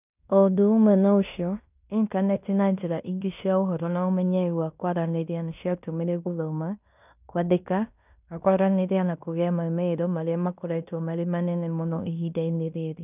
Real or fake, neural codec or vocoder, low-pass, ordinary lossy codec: fake; codec, 16 kHz in and 24 kHz out, 0.9 kbps, LongCat-Audio-Codec, fine tuned four codebook decoder; 3.6 kHz; none